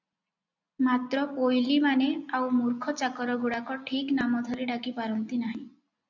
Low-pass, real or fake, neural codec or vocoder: 7.2 kHz; real; none